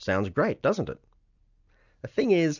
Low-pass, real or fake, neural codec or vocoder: 7.2 kHz; real; none